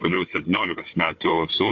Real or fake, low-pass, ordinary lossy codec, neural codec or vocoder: fake; 7.2 kHz; MP3, 48 kbps; codec, 16 kHz, 4 kbps, FunCodec, trained on Chinese and English, 50 frames a second